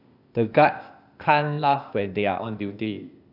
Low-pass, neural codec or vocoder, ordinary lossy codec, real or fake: 5.4 kHz; codec, 16 kHz, 0.8 kbps, ZipCodec; none; fake